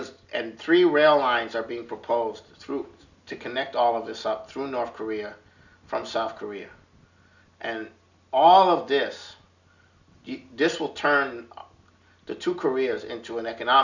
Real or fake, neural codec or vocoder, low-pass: real; none; 7.2 kHz